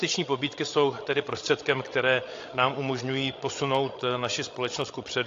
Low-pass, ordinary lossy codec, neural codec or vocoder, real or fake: 7.2 kHz; AAC, 48 kbps; codec, 16 kHz, 16 kbps, FreqCodec, larger model; fake